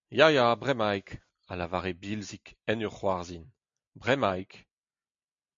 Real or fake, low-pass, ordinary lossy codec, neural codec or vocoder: real; 7.2 kHz; MP3, 48 kbps; none